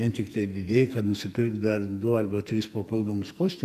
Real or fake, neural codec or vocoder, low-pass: fake; codec, 44.1 kHz, 2.6 kbps, SNAC; 14.4 kHz